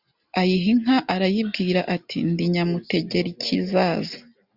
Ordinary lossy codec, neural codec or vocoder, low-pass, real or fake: Opus, 64 kbps; none; 5.4 kHz; real